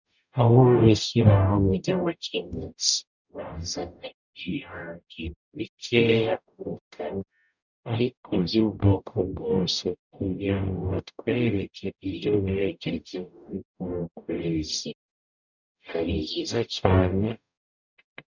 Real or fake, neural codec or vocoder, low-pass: fake; codec, 44.1 kHz, 0.9 kbps, DAC; 7.2 kHz